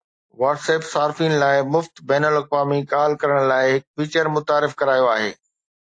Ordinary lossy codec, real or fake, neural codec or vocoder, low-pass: AAC, 48 kbps; real; none; 9.9 kHz